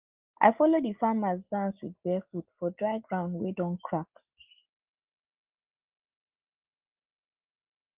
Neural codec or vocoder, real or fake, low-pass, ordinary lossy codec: none; real; 3.6 kHz; Opus, 24 kbps